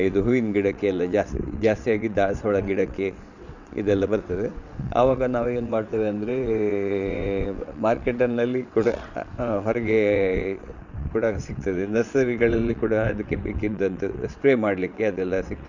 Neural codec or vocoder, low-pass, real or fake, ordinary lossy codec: vocoder, 22.05 kHz, 80 mel bands, WaveNeXt; 7.2 kHz; fake; none